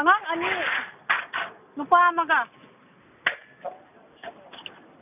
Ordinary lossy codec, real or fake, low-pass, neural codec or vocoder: none; real; 3.6 kHz; none